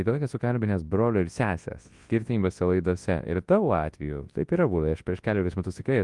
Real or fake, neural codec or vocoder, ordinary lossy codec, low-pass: fake; codec, 24 kHz, 0.9 kbps, WavTokenizer, large speech release; Opus, 24 kbps; 10.8 kHz